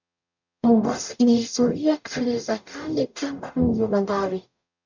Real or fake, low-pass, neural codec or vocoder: fake; 7.2 kHz; codec, 44.1 kHz, 0.9 kbps, DAC